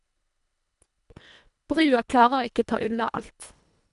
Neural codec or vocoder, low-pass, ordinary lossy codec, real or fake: codec, 24 kHz, 1.5 kbps, HILCodec; 10.8 kHz; none; fake